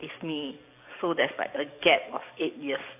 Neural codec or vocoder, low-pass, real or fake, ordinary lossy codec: codec, 44.1 kHz, 7.8 kbps, DAC; 3.6 kHz; fake; AAC, 32 kbps